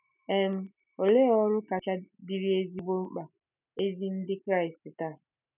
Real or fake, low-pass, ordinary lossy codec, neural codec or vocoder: real; 3.6 kHz; none; none